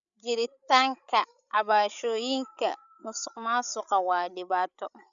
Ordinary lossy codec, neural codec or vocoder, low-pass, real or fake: none; codec, 16 kHz, 8 kbps, FreqCodec, larger model; 7.2 kHz; fake